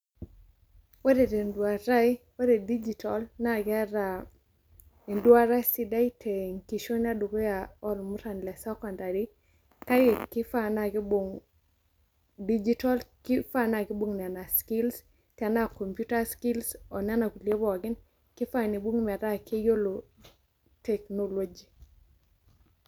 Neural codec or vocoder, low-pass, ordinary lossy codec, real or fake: none; none; none; real